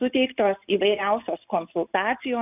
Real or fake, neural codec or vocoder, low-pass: real; none; 3.6 kHz